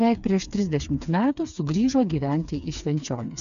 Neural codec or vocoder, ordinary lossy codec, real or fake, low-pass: codec, 16 kHz, 4 kbps, FreqCodec, smaller model; MP3, 96 kbps; fake; 7.2 kHz